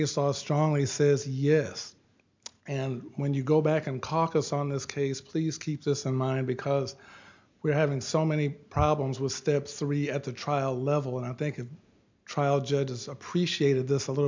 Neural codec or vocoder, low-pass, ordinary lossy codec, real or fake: none; 7.2 kHz; MP3, 64 kbps; real